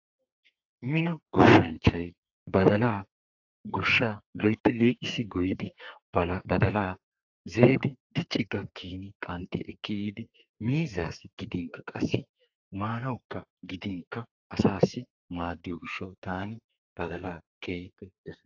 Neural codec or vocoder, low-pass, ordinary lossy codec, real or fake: codec, 32 kHz, 1.9 kbps, SNAC; 7.2 kHz; AAC, 48 kbps; fake